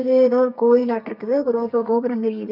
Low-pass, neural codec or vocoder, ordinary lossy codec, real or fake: 5.4 kHz; codec, 32 kHz, 1.9 kbps, SNAC; none; fake